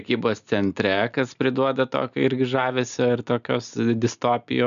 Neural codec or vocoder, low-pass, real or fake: none; 7.2 kHz; real